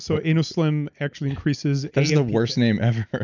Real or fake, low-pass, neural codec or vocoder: real; 7.2 kHz; none